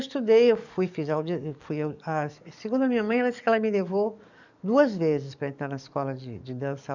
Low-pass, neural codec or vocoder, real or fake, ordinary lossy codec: 7.2 kHz; codec, 44.1 kHz, 7.8 kbps, DAC; fake; none